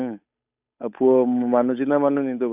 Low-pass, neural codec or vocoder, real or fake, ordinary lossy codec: 3.6 kHz; codec, 16 kHz, 8 kbps, FunCodec, trained on Chinese and English, 25 frames a second; fake; none